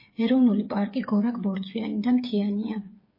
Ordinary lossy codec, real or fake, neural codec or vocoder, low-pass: MP3, 24 kbps; fake; codec, 16 kHz, 8 kbps, FreqCodec, smaller model; 5.4 kHz